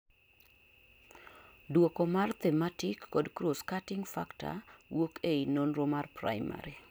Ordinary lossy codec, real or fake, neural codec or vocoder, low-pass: none; real; none; none